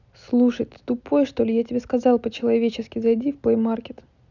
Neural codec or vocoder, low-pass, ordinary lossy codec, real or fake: none; 7.2 kHz; none; real